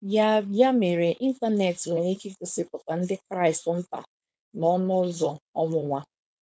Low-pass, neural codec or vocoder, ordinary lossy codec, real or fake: none; codec, 16 kHz, 4.8 kbps, FACodec; none; fake